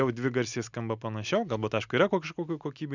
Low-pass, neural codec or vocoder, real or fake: 7.2 kHz; none; real